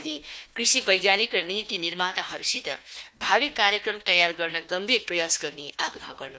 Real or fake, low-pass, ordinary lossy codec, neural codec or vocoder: fake; none; none; codec, 16 kHz, 1 kbps, FunCodec, trained on Chinese and English, 50 frames a second